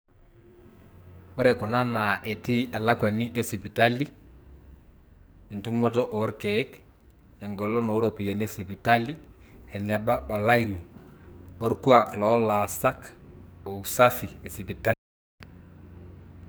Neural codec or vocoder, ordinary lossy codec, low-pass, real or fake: codec, 44.1 kHz, 2.6 kbps, SNAC; none; none; fake